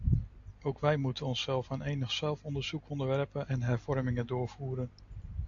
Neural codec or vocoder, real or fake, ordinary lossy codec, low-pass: none; real; AAC, 48 kbps; 7.2 kHz